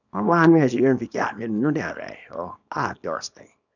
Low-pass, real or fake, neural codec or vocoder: 7.2 kHz; fake; codec, 24 kHz, 0.9 kbps, WavTokenizer, small release